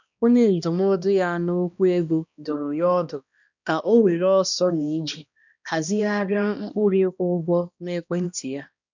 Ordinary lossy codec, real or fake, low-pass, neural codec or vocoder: none; fake; 7.2 kHz; codec, 16 kHz, 1 kbps, X-Codec, HuBERT features, trained on balanced general audio